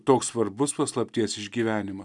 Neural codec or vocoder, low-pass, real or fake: none; 10.8 kHz; real